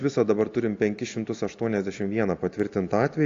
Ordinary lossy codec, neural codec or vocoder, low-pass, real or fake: AAC, 48 kbps; none; 7.2 kHz; real